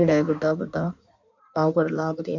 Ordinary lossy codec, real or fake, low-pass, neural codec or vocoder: none; fake; 7.2 kHz; codec, 16 kHz in and 24 kHz out, 1.1 kbps, FireRedTTS-2 codec